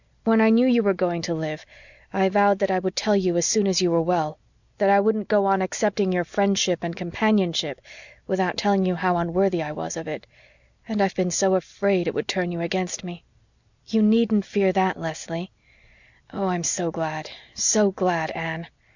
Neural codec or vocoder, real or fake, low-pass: none; real; 7.2 kHz